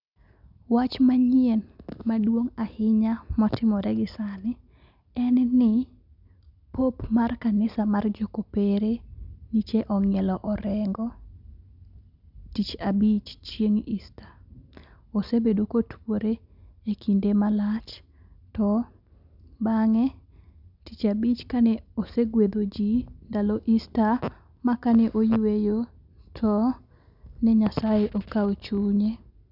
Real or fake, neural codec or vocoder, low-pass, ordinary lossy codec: real; none; 5.4 kHz; none